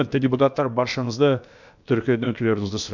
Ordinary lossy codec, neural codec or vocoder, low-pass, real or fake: none; codec, 16 kHz, about 1 kbps, DyCAST, with the encoder's durations; 7.2 kHz; fake